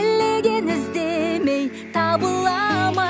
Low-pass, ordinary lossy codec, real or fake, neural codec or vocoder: none; none; real; none